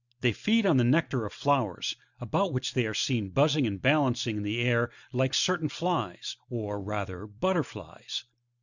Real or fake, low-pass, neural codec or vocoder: real; 7.2 kHz; none